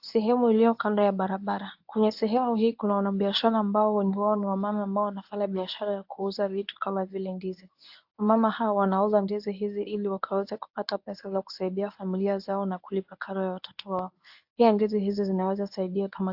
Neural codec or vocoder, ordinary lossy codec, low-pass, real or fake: codec, 24 kHz, 0.9 kbps, WavTokenizer, medium speech release version 1; AAC, 48 kbps; 5.4 kHz; fake